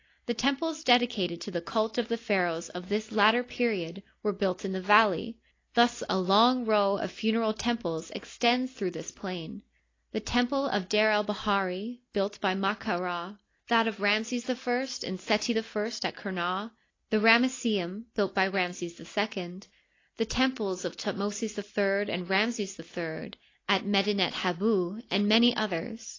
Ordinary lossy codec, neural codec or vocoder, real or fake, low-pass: AAC, 32 kbps; none; real; 7.2 kHz